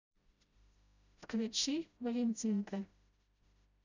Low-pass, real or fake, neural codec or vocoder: 7.2 kHz; fake; codec, 16 kHz, 0.5 kbps, FreqCodec, smaller model